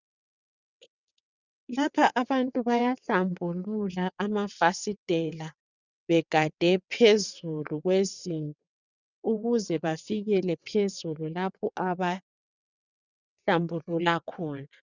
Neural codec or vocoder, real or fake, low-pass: vocoder, 22.05 kHz, 80 mel bands, WaveNeXt; fake; 7.2 kHz